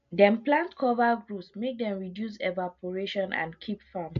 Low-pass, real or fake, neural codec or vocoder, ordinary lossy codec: 7.2 kHz; real; none; MP3, 48 kbps